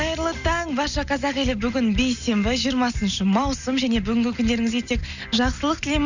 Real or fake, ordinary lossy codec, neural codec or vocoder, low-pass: real; none; none; 7.2 kHz